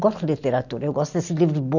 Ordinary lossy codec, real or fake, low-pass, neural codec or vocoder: none; real; 7.2 kHz; none